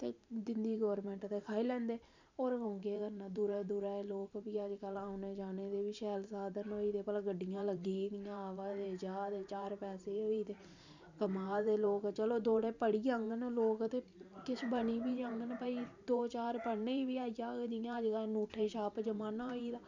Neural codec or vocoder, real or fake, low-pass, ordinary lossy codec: vocoder, 44.1 kHz, 128 mel bands every 512 samples, BigVGAN v2; fake; 7.2 kHz; none